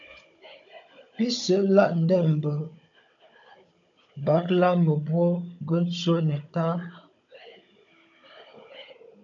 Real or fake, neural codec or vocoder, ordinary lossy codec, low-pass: fake; codec, 16 kHz, 16 kbps, FunCodec, trained on Chinese and English, 50 frames a second; MP3, 64 kbps; 7.2 kHz